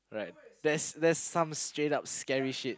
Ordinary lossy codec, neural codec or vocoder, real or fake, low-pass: none; none; real; none